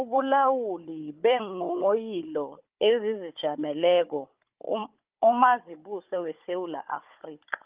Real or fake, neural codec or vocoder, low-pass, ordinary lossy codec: fake; codec, 16 kHz, 16 kbps, FunCodec, trained on Chinese and English, 50 frames a second; 3.6 kHz; Opus, 24 kbps